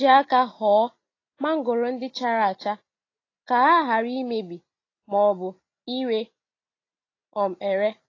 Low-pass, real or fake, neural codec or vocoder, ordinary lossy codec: 7.2 kHz; real; none; AAC, 32 kbps